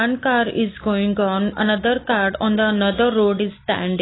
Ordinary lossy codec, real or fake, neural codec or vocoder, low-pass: AAC, 16 kbps; real; none; 7.2 kHz